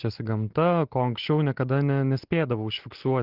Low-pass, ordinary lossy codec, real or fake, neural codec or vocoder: 5.4 kHz; Opus, 16 kbps; real; none